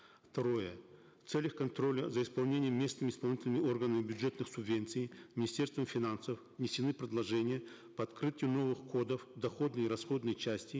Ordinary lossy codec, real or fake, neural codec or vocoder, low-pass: none; real; none; none